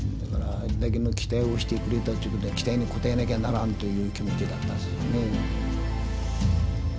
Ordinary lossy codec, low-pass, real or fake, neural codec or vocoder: none; none; real; none